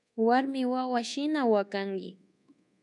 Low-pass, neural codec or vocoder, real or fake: 10.8 kHz; codec, 24 kHz, 1.2 kbps, DualCodec; fake